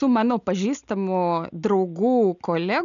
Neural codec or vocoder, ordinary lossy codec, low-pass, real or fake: none; MP3, 96 kbps; 7.2 kHz; real